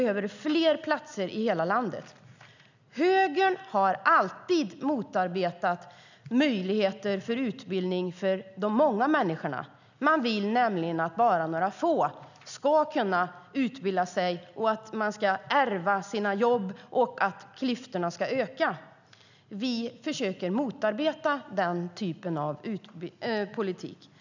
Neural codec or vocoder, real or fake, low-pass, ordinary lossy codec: none; real; 7.2 kHz; none